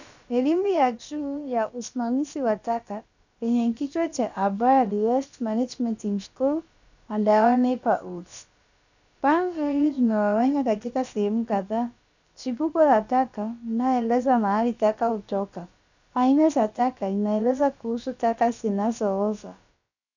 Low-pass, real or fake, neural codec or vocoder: 7.2 kHz; fake; codec, 16 kHz, about 1 kbps, DyCAST, with the encoder's durations